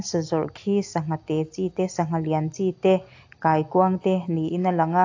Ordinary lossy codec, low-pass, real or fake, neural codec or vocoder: AAC, 48 kbps; 7.2 kHz; real; none